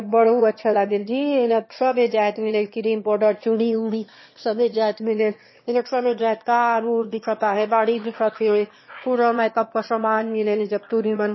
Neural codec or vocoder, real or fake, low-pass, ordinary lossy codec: autoencoder, 22.05 kHz, a latent of 192 numbers a frame, VITS, trained on one speaker; fake; 7.2 kHz; MP3, 24 kbps